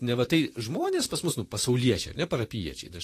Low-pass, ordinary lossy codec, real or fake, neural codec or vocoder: 14.4 kHz; AAC, 48 kbps; fake; vocoder, 44.1 kHz, 128 mel bands, Pupu-Vocoder